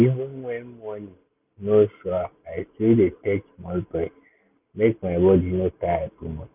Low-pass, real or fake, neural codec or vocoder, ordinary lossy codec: 3.6 kHz; real; none; none